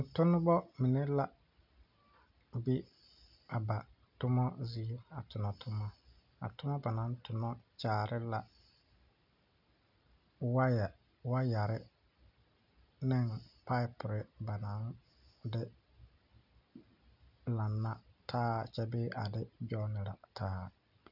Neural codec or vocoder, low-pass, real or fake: none; 5.4 kHz; real